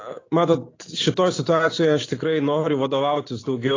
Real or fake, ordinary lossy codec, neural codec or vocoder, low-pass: real; AAC, 32 kbps; none; 7.2 kHz